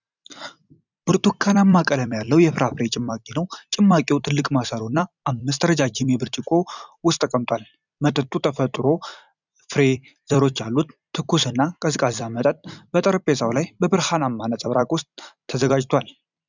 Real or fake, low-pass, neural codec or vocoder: fake; 7.2 kHz; vocoder, 44.1 kHz, 128 mel bands every 256 samples, BigVGAN v2